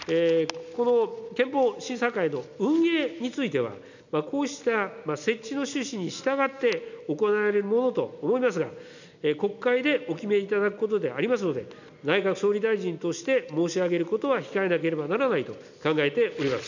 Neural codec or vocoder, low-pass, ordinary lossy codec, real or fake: none; 7.2 kHz; none; real